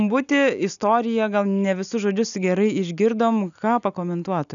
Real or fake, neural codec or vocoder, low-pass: real; none; 7.2 kHz